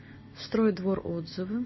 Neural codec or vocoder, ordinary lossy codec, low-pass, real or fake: none; MP3, 24 kbps; 7.2 kHz; real